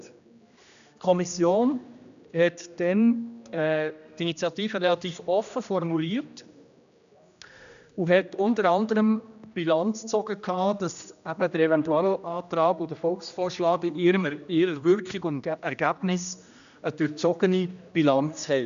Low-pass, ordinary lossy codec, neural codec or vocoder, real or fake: 7.2 kHz; none; codec, 16 kHz, 1 kbps, X-Codec, HuBERT features, trained on general audio; fake